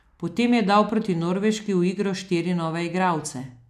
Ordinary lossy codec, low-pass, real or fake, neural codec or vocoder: none; 14.4 kHz; real; none